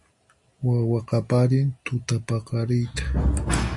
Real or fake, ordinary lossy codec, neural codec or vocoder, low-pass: real; AAC, 64 kbps; none; 10.8 kHz